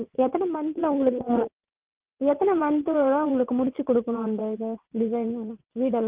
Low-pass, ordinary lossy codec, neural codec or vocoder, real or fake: 3.6 kHz; Opus, 32 kbps; vocoder, 22.05 kHz, 80 mel bands, WaveNeXt; fake